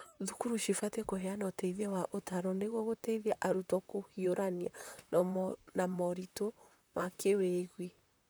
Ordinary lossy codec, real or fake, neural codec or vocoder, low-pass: none; fake; vocoder, 44.1 kHz, 128 mel bands, Pupu-Vocoder; none